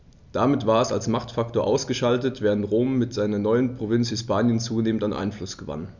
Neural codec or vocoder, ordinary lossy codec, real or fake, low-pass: none; none; real; 7.2 kHz